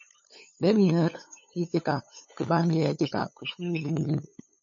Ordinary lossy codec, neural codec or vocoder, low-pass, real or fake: MP3, 32 kbps; codec, 16 kHz, 8 kbps, FunCodec, trained on LibriTTS, 25 frames a second; 7.2 kHz; fake